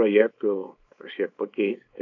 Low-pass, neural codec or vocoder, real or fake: 7.2 kHz; codec, 24 kHz, 0.9 kbps, WavTokenizer, small release; fake